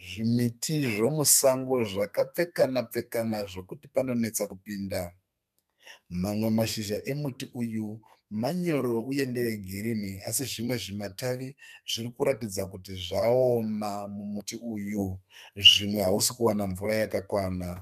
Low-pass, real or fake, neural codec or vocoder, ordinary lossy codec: 14.4 kHz; fake; codec, 32 kHz, 1.9 kbps, SNAC; MP3, 96 kbps